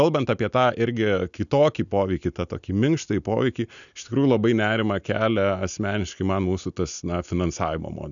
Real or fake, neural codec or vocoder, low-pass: real; none; 7.2 kHz